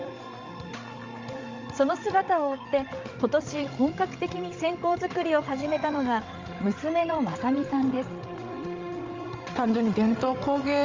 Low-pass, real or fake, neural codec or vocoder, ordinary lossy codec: 7.2 kHz; fake; codec, 16 kHz, 16 kbps, FreqCodec, larger model; Opus, 32 kbps